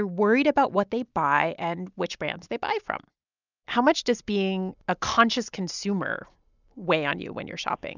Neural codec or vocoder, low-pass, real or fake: none; 7.2 kHz; real